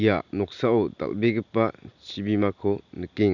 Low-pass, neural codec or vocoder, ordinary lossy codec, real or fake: 7.2 kHz; none; none; real